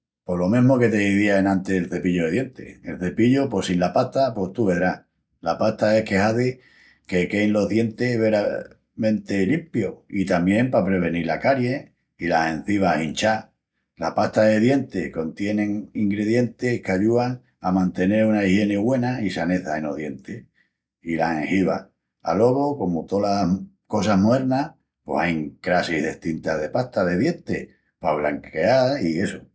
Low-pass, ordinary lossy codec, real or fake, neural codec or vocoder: none; none; real; none